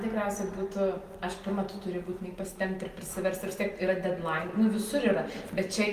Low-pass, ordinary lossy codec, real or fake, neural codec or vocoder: 14.4 kHz; Opus, 24 kbps; real; none